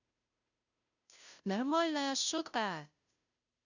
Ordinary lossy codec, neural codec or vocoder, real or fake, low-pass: none; codec, 16 kHz, 0.5 kbps, FunCodec, trained on Chinese and English, 25 frames a second; fake; 7.2 kHz